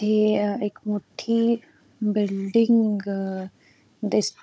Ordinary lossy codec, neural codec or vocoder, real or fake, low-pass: none; codec, 16 kHz, 16 kbps, FunCodec, trained on Chinese and English, 50 frames a second; fake; none